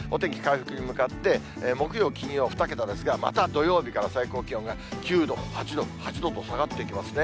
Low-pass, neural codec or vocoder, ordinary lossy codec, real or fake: none; none; none; real